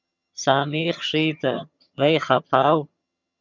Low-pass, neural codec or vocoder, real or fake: 7.2 kHz; vocoder, 22.05 kHz, 80 mel bands, HiFi-GAN; fake